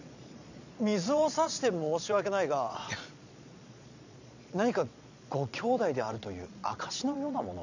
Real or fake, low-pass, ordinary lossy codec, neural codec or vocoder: fake; 7.2 kHz; none; vocoder, 22.05 kHz, 80 mel bands, Vocos